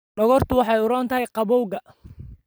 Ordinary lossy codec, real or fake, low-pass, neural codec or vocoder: none; fake; none; vocoder, 44.1 kHz, 128 mel bands every 256 samples, BigVGAN v2